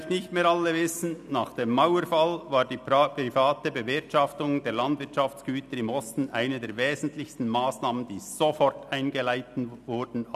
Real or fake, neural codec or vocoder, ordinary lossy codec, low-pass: real; none; none; 14.4 kHz